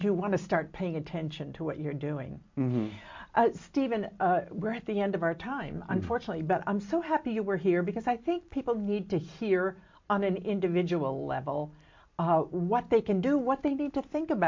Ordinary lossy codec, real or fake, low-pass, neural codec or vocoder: MP3, 48 kbps; real; 7.2 kHz; none